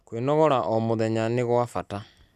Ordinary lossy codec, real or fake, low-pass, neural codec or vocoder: none; real; 14.4 kHz; none